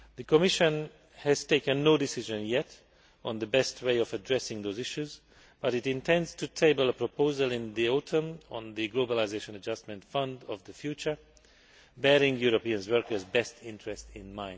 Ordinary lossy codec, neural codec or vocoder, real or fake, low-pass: none; none; real; none